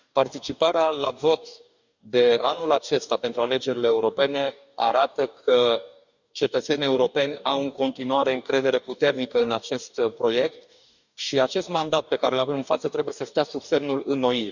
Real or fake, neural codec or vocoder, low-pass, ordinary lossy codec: fake; codec, 44.1 kHz, 2.6 kbps, DAC; 7.2 kHz; none